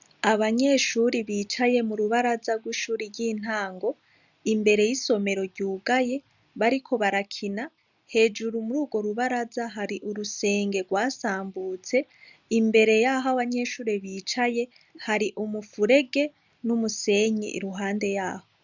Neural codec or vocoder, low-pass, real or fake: none; 7.2 kHz; real